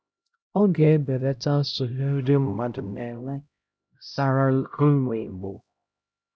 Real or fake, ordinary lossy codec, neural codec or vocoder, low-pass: fake; none; codec, 16 kHz, 0.5 kbps, X-Codec, HuBERT features, trained on LibriSpeech; none